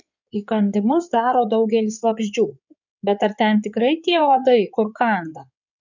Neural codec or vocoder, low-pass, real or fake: codec, 16 kHz in and 24 kHz out, 2.2 kbps, FireRedTTS-2 codec; 7.2 kHz; fake